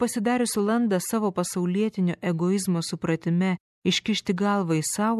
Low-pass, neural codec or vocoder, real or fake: 14.4 kHz; none; real